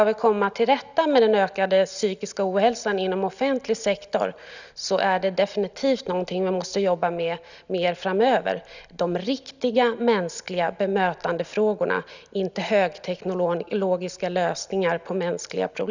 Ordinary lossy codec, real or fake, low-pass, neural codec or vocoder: none; real; 7.2 kHz; none